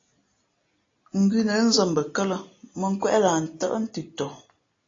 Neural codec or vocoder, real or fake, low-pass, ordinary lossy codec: none; real; 7.2 kHz; AAC, 32 kbps